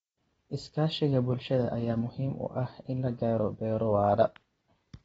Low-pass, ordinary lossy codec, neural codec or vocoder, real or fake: 19.8 kHz; AAC, 24 kbps; none; real